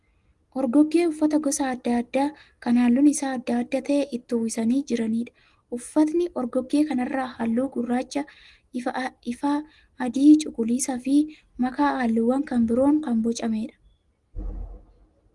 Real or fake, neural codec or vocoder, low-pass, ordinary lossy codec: real; none; 10.8 kHz; Opus, 24 kbps